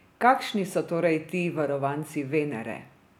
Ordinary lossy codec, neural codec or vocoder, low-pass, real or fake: none; none; 19.8 kHz; real